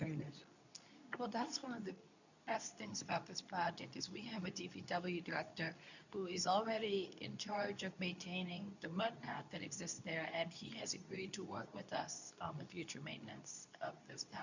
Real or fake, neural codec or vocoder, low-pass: fake; codec, 24 kHz, 0.9 kbps, WavTokenizer, medium speech release version 2; 7.2 kHz